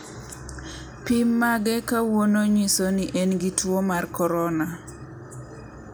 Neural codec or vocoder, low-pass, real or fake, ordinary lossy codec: none; none; real; none